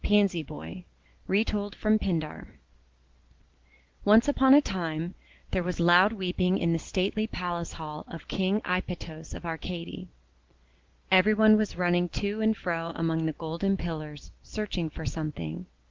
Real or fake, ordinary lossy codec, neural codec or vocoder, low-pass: real; Opus, 16 kbps; none; 7.2 kHz